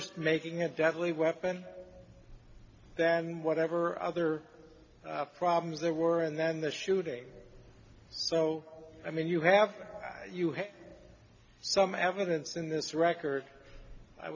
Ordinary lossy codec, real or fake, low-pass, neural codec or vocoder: MP3, 64 kbps; real; 7.2 kHz; none